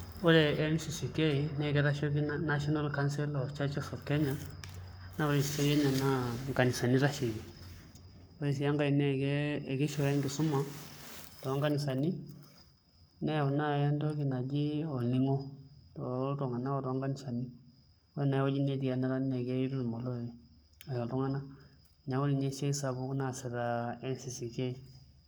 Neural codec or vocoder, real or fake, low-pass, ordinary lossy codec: codec, 44.1 kHz, 7.8 kbps, Pupu-Codec; fake; none; none